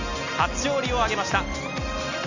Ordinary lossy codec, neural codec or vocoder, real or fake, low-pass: none; none; real; 7.2 kHz